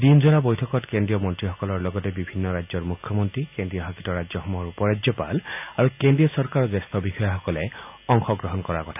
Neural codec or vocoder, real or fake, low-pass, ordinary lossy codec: none; real; 3.6 kHz; none